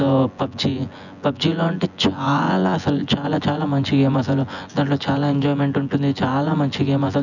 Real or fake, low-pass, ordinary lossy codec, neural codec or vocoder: fake; 7.2 kHz; none; vocoder, 24 kHz, 100 mel bands, Vocos